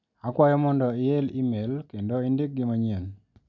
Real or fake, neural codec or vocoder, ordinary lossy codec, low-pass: real; none; none; 7.2 kHz